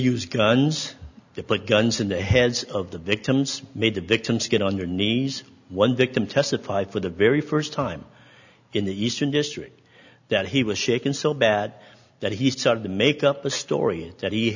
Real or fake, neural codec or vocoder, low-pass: real; none; 7.2 kHz